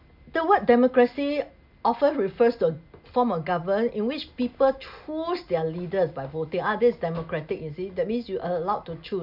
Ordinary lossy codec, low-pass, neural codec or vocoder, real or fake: none; 5.4 kHz; none; real